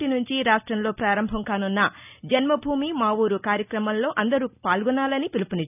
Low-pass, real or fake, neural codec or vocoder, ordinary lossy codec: 3.6 kHz; real; none; none